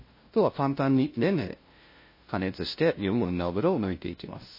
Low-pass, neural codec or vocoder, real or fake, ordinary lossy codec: 5.4 kHz; codec, 16 kHz, 0.5 kbps, FunCodec, trained on LibriTTS, 25 frames a second; fake; MP3, 24 kbps